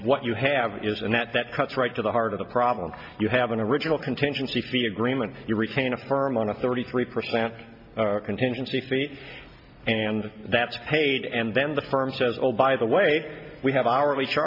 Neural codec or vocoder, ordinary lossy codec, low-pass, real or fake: none; MP3, 48 kbps; 5.4 kHz; real